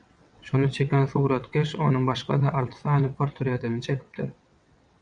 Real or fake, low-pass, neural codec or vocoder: fake; 9.9 kHz; vocoder, 22.05 kHz, 80 mel bands, WaveNeXt